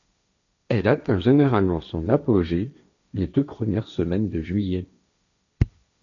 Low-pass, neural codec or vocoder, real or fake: 7.2 kHz; codec, 16 kHz, 1.1 kbps, Voila-Tokenizer; fake